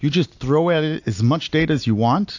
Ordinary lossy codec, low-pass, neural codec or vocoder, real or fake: AAC, 48 kbps; 7.2 kHz; none; real